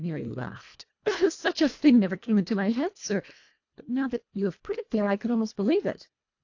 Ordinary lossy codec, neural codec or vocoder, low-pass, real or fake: AAC, 48 kbps; codec, 24 kHz, 1.5 kbps, HILCodec; 7.2 kHz; fake